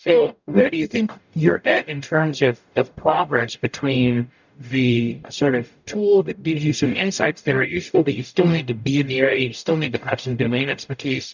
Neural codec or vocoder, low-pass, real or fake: codec, 44.1 kHz, 0.9 kbps, DAC; 7.2 kHz; fake